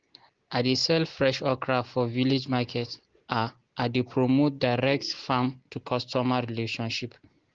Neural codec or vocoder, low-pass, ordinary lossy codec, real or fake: none; 7.2 kHz; Opus, 16 kbps; real